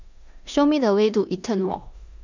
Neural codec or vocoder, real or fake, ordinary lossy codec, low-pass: codec, 16 kHz in and 24 kHz out, 0.9 kbps, LongCat-Audio-Codec, four codebook decoder; fake; none; 7.2 kHz